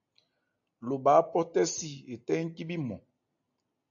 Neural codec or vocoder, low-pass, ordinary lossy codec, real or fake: none; 7.2 kHz; AAC, 64 kbps; real